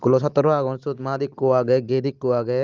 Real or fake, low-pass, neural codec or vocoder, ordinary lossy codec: real; 7.2 kHz; none; Opus, 32 kbps